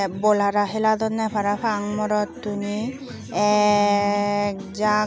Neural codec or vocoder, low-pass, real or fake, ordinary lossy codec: none; none; real; none